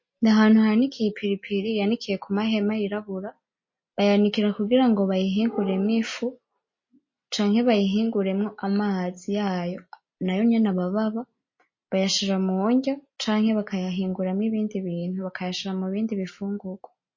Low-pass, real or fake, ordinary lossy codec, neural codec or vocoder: 7.2 kHz; real; MP3, 32 kbps; none